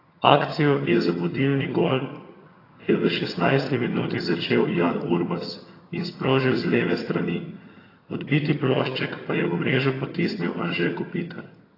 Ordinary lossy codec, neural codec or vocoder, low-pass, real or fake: AAC, 24 kbps; vocoder, 22.05 kHz, 80 mel bands, HiFi-GAN; 5.4 kHz; fake